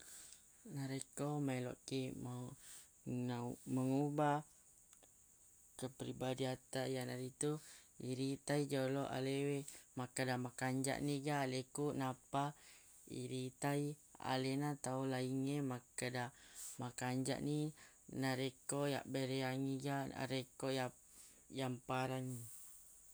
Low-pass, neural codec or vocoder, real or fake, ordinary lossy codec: none; none; real; none